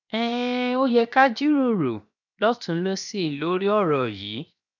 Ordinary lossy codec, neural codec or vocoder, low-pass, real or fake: none; codec, 16 kHz, 0.7 kbps, FocalCodec; 7.2 kHz; fake